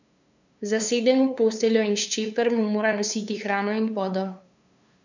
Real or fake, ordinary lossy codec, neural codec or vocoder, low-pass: fake; none; codec, 16 kHz, 2 kbps, FunCodec, trained on LibriTTS, 25 frames a second; 7.2 kHz